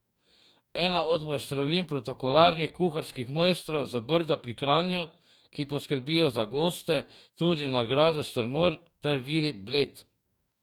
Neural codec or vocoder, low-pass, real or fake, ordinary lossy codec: codec, 44.1 kHz, 2.6 kbps, DAC; 19.8 kHz; fake; none